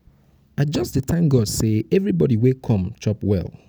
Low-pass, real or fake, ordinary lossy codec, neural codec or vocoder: none; real; none; none